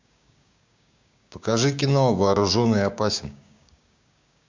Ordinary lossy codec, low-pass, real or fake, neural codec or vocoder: MP3, 64 kbps; 7.2 kHz; fake; codec, 16 kHz, 6 kbps, DAC